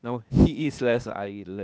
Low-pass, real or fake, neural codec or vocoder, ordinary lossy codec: none; fake; codec, 16 kHz, 0.8 kbps, ZipCodec; none